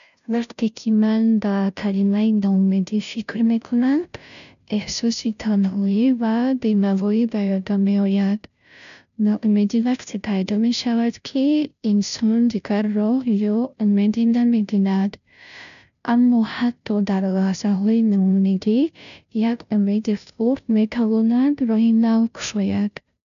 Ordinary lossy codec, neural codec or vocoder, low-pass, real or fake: none; codec, 16 kHz, 0.5 kbps, FunCodec, trained on Chinese and English, 25 frames a second; 7.2 kHz; fake